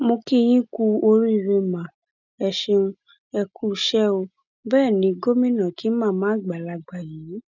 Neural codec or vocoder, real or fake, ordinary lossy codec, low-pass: none; real; none; 7.2 kHz